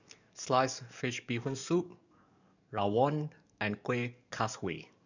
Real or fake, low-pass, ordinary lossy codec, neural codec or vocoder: fake; 7.2 kHz; none; codec, 44.1 kHz, 7.8 kbps, DAC